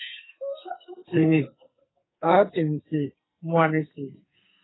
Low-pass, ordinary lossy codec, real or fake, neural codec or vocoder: 7.2 kHz; AAC, 16 kbps; fake; codec, 44.1 kHz, 2.6 kbps, SNAC